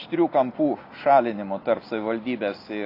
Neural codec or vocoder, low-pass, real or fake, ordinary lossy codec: none; 5.4 kHz; real; AAC, 32 kbps